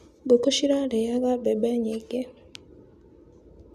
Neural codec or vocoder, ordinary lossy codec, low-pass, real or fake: none; none; 14.4 kHz; real